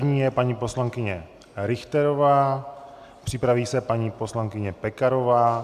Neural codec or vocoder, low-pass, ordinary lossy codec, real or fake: none; 14.4 kHz; AAC, 96 kbps; real